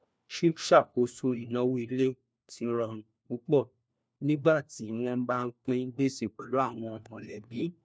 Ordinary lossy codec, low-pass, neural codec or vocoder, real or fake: none; none; codec, 16 kHz, 1 kbps, FunCodec, trained on LibriTTS, 50 frames a second; fake